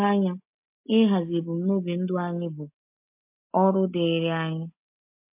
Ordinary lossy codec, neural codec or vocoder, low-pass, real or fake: MP3, 32 kbps; none; 3.6 kHz; real